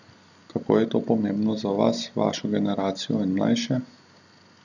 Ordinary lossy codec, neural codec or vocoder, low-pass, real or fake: none; none; 7.2 kHz; real